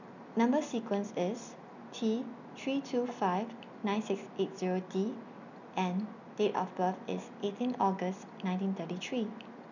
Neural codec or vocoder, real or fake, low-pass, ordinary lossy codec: none; real; 7.2 kHz; none